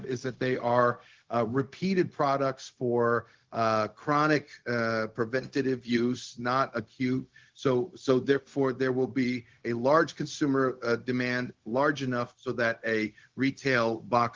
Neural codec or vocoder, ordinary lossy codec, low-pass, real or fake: codec, 16 kHz, 0.4 kbps, LongCat-Audio-Codec; Opus, 16 kbps; 7.2 kHz; fake